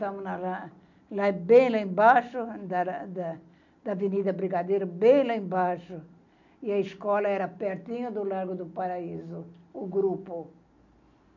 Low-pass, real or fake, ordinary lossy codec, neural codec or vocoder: 7.2 kHz; real; none; none